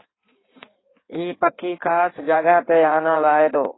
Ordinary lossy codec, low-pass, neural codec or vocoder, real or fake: AAC, 16 kbps; 7.2 kHz; codec, 16 kHz in and 24 kHz out, 1.1 kbps, FireRedTTS-2 codec; fake